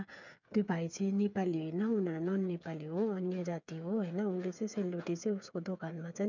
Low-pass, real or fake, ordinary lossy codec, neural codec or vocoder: 7.2 kHz; fake; none; codec, 16 kHz, 8 kbps, FreqCodec, smaller model